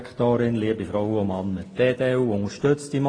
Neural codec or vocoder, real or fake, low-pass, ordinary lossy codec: none; real; 9.9 kHz; AAC, 32 kbps